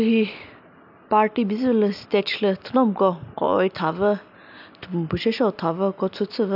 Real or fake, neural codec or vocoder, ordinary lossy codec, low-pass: real; none; AAC, 48 kbps; 5.4 kHz